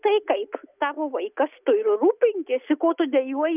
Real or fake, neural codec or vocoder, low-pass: real; none; 3.6 kHz